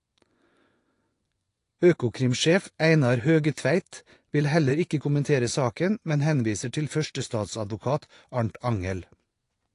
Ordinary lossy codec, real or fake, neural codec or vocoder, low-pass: AAC, 48 kbps; fake; vocoder, 24 kHz, 100 mel bands, Vocos; 10.8 kHz